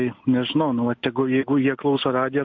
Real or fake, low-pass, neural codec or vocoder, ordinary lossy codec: real; 7.2 kHz; none; MP3, 48 kbps